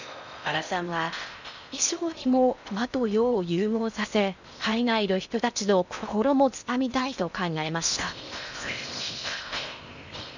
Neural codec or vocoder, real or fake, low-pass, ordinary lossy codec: codec, 16 kHz in and 24 kHz out, 0.6 kbps, FocalCodec, streaming, 4096 codes; fake; 7.2 kHz; none